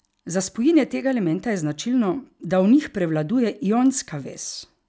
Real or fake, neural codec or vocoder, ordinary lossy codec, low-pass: real; none; none; none